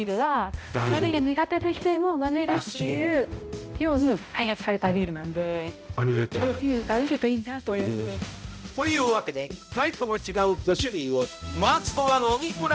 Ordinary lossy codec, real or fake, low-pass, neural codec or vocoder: none; fake; none; codec, 16 kHz, 0.5 kbps, X-Codec, HuBERT features, trained on balanced general audio